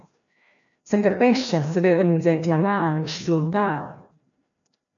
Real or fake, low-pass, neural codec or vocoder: fake; 7.2 kHz; codec, 16 kHz, 1 kbps, FreqCodec, larger model